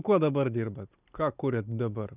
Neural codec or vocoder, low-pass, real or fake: none; 3.6 kHz; real